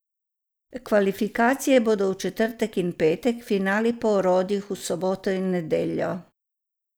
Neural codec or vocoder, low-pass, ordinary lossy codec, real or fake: none; none; none; real